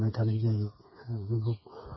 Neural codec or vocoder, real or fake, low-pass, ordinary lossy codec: codec, 16 kHz, 4 kbps, FreqCodec, smaller model; fake; 7.2 kHz; MP3, 24 kbps